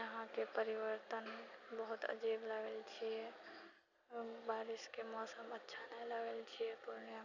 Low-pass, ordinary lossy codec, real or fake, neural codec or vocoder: 7.2 kHz; none; real; none